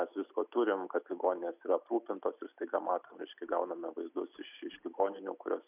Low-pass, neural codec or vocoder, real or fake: 3.6 kHz; none; real